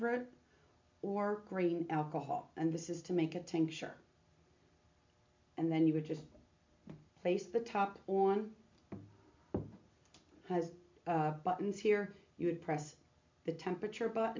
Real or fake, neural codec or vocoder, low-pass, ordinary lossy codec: real; none; 7.2 kHz; MP3, 64 kbps